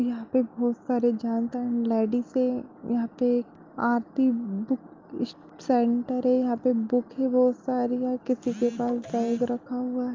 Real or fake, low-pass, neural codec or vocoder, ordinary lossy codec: real; 7.2 kHz; none; Opus, 32 kbps